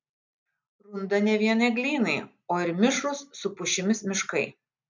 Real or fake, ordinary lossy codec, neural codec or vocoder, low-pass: real; MP3, 64 kbps; none; 7.2 kHz